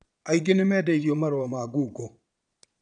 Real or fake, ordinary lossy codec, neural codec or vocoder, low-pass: fake; none; vocoder, 22.05 kHz, 80 mel bands, Vocos; 9.9 kHz